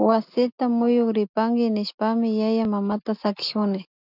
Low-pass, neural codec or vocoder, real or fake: 5.4 kHz; none; real